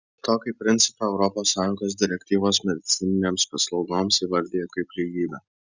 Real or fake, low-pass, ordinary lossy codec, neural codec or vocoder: real; 7.2 kHz; Opus, 64 kbps; none